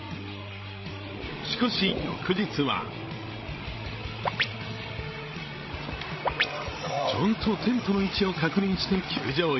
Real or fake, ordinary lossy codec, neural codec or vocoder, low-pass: fake; MP3, 24 kbps; codec, 16 kHz, 16 kbps, FreqCodec, larger model; 7.2 kHz